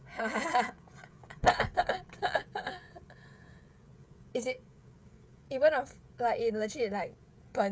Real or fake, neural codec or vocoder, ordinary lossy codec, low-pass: fake; codec, 16 kHz, 16 kbps, FreqCodec, smaller model; none; none